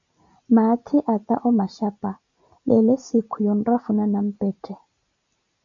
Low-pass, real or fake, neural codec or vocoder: 7.2 kHz; real; none